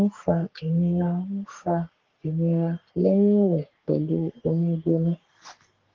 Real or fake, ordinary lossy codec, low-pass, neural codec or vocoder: fake; Opus, 16 kbps; 7.2 kHz; codec, 44.1 kHz, 3.4 kbps, Pupu-Codec